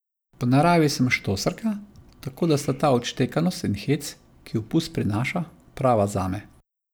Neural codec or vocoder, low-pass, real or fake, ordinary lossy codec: none; none; real; none